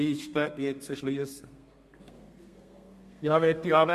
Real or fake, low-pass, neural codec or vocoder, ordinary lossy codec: fake; 14.4 kHz; codec, 32 kHz, 1.9 kbps, SNAC; MP3, 64 kbps